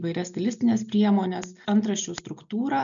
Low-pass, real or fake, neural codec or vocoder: 7.2 kHz; real; none